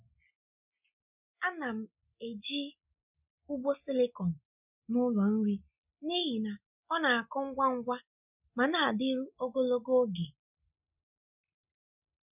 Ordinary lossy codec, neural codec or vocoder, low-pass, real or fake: none; none; 3.6 kHz; real